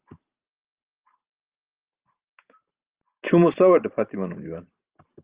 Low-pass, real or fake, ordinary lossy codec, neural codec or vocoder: 3.6 kHz; fake; Opus, 24 kbps; vocoder, 44.1 kHz, 128 mel bands every 512 samples, BigVGAN v2